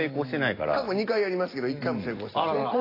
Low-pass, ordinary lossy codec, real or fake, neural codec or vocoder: 5.4 kHz; AAC, 24 kbps; real; none